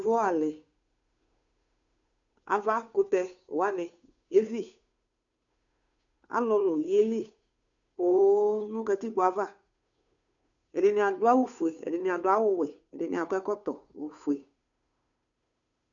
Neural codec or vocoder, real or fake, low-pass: codec, 16 kHz, 2 kbps, FunCodec, trained on Chinese and English, 25 frames a second; fake; 7.2 kHz